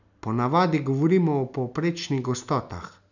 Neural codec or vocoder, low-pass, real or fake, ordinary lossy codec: none; 7.2 kHz; real; none